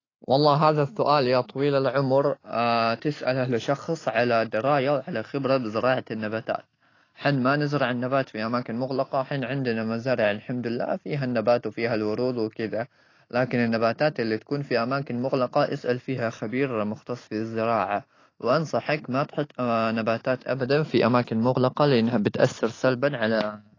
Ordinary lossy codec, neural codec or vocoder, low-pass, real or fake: AAC, 32 kbps; none; 7.2 kHz; real